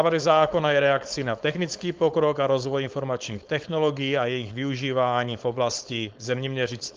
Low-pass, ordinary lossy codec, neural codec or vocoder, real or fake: 7.2 kHz; Opus, 32 kbps; codec, 16 kHz, 4.8 kbps, FACodec; fake